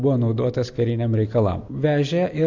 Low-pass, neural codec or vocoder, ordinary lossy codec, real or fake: 7.2 kHz; none; AAC, 48 kbps; real